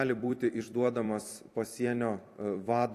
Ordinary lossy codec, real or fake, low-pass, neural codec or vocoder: AAC, 64 kbps; fake; 14.4 kHz; vocoder, 44.1 kHz, 128 mel bands every 256 samples, BigVGAN v2